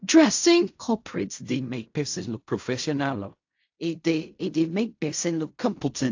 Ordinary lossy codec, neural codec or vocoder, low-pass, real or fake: AAC, 48 kbps; codec, 16 kHz in and 24 kHz out, 0.4 kbps, LongCat-Audio-Codec, fine tuned four codebook decoder; 7.2 kHz; fake